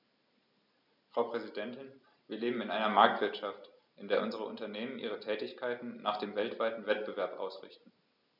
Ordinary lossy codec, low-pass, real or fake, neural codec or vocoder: none; 5.4 kHz; real; none